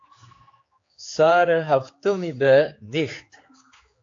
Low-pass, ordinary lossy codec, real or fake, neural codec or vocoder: 7.2 kHz; AAC, 48 kbps; fake; codec, 16 kHz, 4 kbps, X-Codec, HuBERT features, trained on general audio